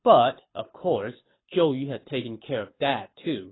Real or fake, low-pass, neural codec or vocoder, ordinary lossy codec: fake; 7.2 kHz; codec, 24 kHz, 6 kbps, HILCodec; AAC, 16 kbps